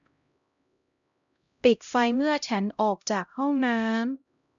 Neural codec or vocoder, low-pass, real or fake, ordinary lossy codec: codec, 16 kHz, 0.5 kbps, X-Codec, HuBERT features, trained on LibriSpeech; 7.2 kHz; fake; none